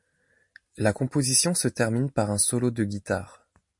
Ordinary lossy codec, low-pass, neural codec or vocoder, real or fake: MP3, 48 kbps; 10.8 kHz; none; real